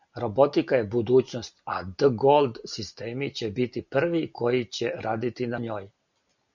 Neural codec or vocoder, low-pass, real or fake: none; 7.2 kHz; real